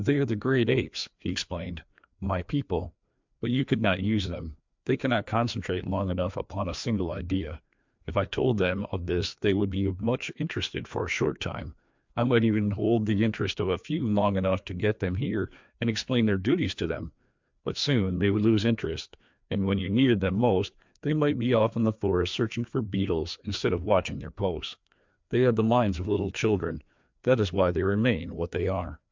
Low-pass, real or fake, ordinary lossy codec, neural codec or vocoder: 7.2 kHz; fake; MP3, 64 kbps; codec, 16 kHz, 2 kbps, FreqCodec, larger model